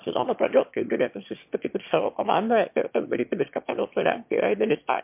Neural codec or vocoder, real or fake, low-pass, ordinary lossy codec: autoencoder, 22.05 kHz, a latent of 192 numbers a frame, VITS, trained on one speaker; fake; 3.6 kHz; MP3, 32 kbps